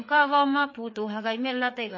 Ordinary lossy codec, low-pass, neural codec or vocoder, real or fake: MP3, 32 kbps; 7.2 kHz; codec, 16 kHz, 4 kbps, FreqCodec, larger model; fake